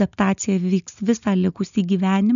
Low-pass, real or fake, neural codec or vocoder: 7.2 kHz; real; none